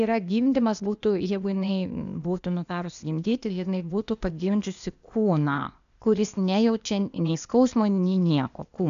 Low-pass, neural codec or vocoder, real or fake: 7.2 kHz; codec, 16 kHz, 0.8 kbps, ZipCodec; fake